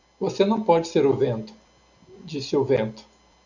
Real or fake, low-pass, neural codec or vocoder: real; 7.2 kHz; none